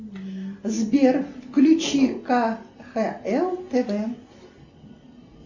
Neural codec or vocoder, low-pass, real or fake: none; 7.2 kHz; real